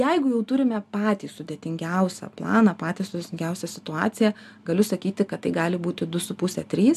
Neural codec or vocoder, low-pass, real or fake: none; 14.4 kHz; real